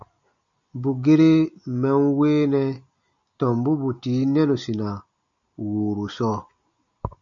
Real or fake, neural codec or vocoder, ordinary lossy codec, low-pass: real; none; MP3, 96 kbps; 7.2 kHz